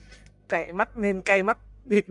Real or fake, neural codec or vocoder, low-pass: fake; codec, 44.1 kHz, 1.7 kbps, Pupu-Codec; 10.8 kHz